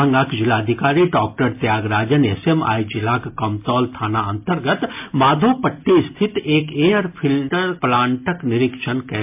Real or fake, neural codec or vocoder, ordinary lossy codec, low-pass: real; none; MP3, 32 kbps; 3.6 kHz